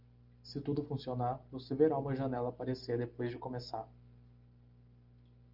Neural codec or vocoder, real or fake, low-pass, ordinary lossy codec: none; real; 5.4 kHz; Opus, 24 kbps